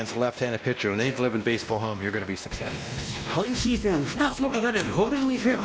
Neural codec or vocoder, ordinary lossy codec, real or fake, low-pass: codec, 16 kHz, 1 kbps, X-Codec, WavLM features, trained on Multilingual LibriSpeech; none; fake; none